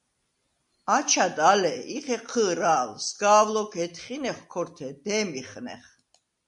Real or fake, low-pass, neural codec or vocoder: real; 10.8 kHz; none